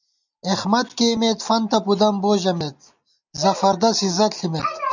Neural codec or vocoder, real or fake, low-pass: none; real; 7.2 kHz